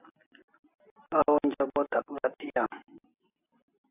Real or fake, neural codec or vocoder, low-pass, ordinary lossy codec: real; none; 3.6 kHz; AAC, 32 kbps